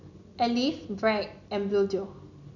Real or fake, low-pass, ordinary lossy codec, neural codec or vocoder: real; 7.2 kHz; none; none